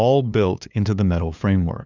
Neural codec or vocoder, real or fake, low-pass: codec, 16 kHz, 2 kbps, FunCodec, trained on LibriTTS, 25 frames a second; fake; 7.2 kHz